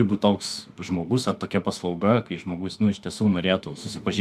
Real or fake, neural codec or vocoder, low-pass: fake; autoencoder, 48 kHz, 32 numbers a frame, DAC-VAE, trained on Japanese speech; 14.4 kHz